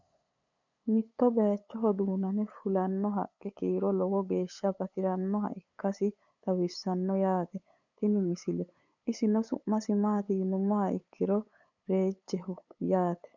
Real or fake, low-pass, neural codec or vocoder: fake; 7.2 kHz; codec, 16 kHz, 8 kbps, FunCodec, trained on LibriTTS, 25 frames a second